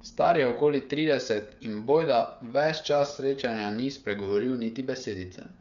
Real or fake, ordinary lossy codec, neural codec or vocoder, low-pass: fake; none; codec, 16 kHz, 8 kbps, FreqCodec, smaller model; 7.2 kHz